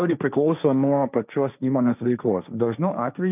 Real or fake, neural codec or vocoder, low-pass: fake; codec, 16 kHz, 1.1 kbps, Voila-Tokenizer; 3.6 kHz